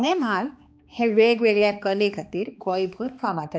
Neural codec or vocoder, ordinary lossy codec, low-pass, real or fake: codec, 16 kHz, 2 kbps, X-Codec, HuBERT features, trained on balanced general audio; none; none; fake